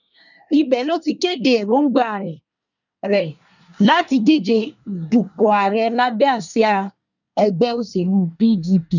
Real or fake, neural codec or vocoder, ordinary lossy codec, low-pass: fake; codec, 24 kHz, 1 kbps, SNAC; none; 7.2 kHz